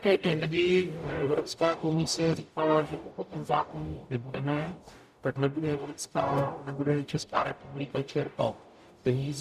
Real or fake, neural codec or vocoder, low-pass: fake; codec, 44.1 kHz, 0.9 kbps, DAC; 14.4 kHz